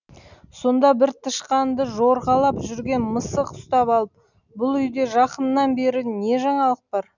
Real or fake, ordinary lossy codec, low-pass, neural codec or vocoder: real; none; 7.2 kHz; none